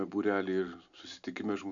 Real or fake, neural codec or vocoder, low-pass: real; none; 7.2 kHz